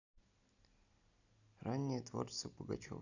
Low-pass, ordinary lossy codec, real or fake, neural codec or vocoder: 7.2 kHz; none; real; none